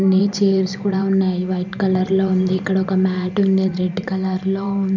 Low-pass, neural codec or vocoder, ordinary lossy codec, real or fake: 7.2 kHz; vocoder, 44.1 kHz, 128 mel bands every 512 samples, BigVGAN v2; none; fake